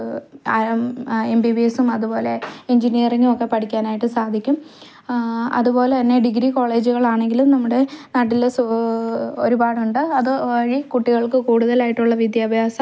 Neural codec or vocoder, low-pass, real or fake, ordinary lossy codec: none; none; real; none